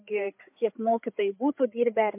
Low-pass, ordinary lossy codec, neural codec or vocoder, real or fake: 3.6 kHz; MP3, 32 kbps; codec, 16 kHz, 8 kbps, FreqCodec, larger model; fake